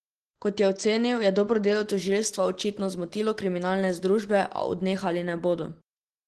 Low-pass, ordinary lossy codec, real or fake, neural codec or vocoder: 10.8 kHz; Opus, 16 kbps; real; none